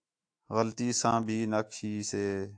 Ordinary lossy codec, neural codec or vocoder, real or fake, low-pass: AAC, 64 kbps; autoencoder, 48 kHz, 128 numbers a frame, DAC-VAE, trained on Japanese speech; fake; 9.9 kHz